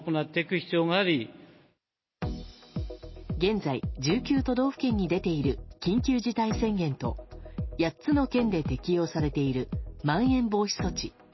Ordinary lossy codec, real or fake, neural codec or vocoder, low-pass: MP3, 24 kbps; real; none; 7.2 kHz